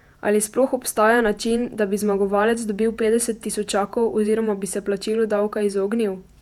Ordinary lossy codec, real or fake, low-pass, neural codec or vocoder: none; fake; 19.8 kHz; vocoder, 48 kHz, 128 mel bands, Vocos